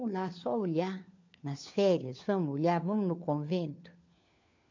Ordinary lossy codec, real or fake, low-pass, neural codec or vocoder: MP3, 48 kbps; fake; 7.2 kHz; codec, 16 kHz, 4 kbps, FunCodec, trained on LibriTTS, 50 frames a second